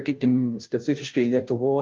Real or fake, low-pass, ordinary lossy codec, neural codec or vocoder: fake; 7.2 kHz; Opus, 32 kbps; codec, 16 kHz, 0.5 kbps, FunCodec, trained on Chinese and English, 25 frames a second